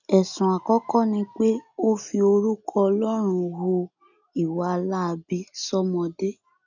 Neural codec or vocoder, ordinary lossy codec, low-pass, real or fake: none; none; 7.2 kHz; real